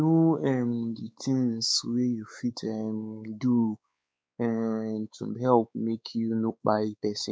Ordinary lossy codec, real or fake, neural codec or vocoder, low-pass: none; fake; codec, 16 kHz, 4 kbps, X-Codec, WavLM features, trained on Multilingual LibriSpeech; none